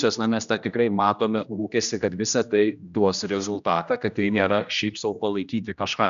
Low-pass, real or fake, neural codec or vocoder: 7.2 kHz; fake; codec, 16 kHz, 1 kbps, X-Codec, HuBERT features, trained on general audio